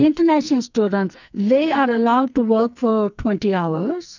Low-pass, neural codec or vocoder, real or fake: 7.2 kHz; codec, 44.1 kHz, 2.6 kbps, SNAC; fake